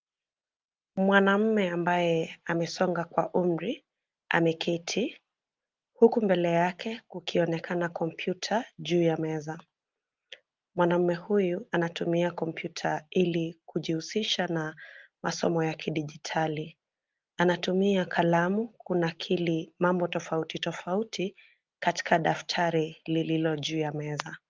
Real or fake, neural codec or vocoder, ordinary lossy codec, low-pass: real; none; Opus, 32 kbps; 7.2 kHz